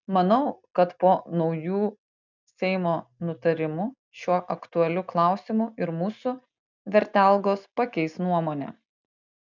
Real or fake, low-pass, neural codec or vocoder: real; 7.2 kHz; none